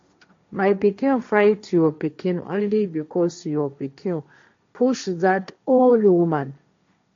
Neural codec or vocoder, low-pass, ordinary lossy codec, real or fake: codec, 16 kHz, 1.1 kbps, Voila-Tokenizer; 7.2 kHz; MP3, 48 kbps; fake